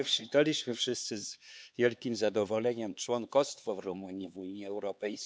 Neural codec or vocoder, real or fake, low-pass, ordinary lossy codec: codec, 16 kHz, 4 kbps, X-Codec, HuBERT features, trained on LibriSpeech; fake; none; none